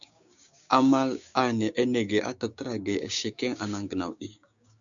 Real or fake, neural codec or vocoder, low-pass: fake; codec, 16 kHz, 6 kbps, DAC; 7.2 kHz